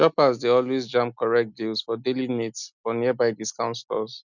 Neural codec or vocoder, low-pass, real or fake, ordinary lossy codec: none; 7.2 kHz; real; none